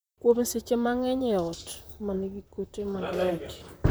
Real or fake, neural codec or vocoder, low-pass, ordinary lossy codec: fake; vocoder, 44.1 kHz, 128 mel bands, Pupu-Vocoder; none; none